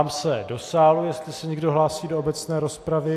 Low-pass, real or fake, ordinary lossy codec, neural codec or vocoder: 14.4 kHz; real; AAC, 64 kbps; none